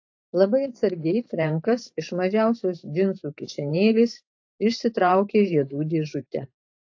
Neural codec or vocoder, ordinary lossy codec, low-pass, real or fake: vocoder, 44.1 kHz, 128 mel bands every 512 samples, BigVGAN v2; AAC, 48 kbps; 7.2 kHz; fake